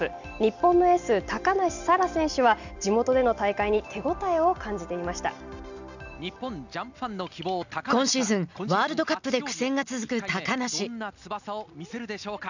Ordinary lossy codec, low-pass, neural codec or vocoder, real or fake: none; 7.2 kHz; none; real